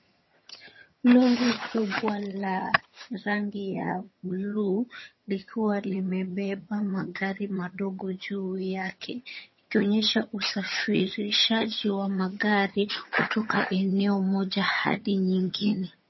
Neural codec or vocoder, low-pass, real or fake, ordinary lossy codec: vocoder, 22.05 kHz, 80 mel bands, HiFi-GAN; 7.2 kHz; fake; MP3, 24 kbps